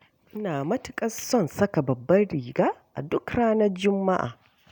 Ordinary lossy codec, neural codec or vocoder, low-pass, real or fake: none; none; none; real